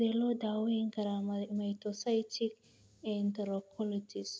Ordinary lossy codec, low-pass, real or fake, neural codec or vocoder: none; none; real; none